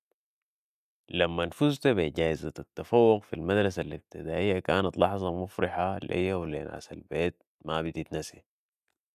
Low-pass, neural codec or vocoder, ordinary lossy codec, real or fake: 14.4 kHz; none; none; real